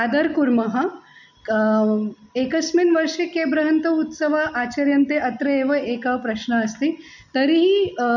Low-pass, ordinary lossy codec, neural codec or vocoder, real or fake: 7.2 kHz; none; none; real